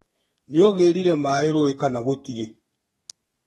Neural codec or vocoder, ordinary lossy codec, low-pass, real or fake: codec, 32 kHz, 1.9 kbps, SNAC; AAC, 32 kbps; 14.4 kHz; fake